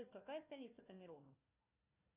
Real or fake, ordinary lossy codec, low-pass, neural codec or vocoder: fake; Opus, 64 kbps; 3.6 kHz; codec, 16 kHz, 1 kbps, FunCodec, trained on Chinese and English, 50 frames a second